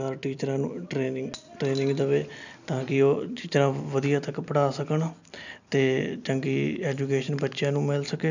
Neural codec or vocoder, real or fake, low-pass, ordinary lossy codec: none; real; 7.2 kHz; none